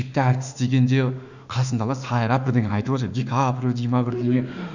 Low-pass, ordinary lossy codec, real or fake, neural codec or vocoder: 7.2 kHz; none; fake; autoencoder, 48 kHz, 32 numbers a frame, DAC-VAE, trained on Japanese speech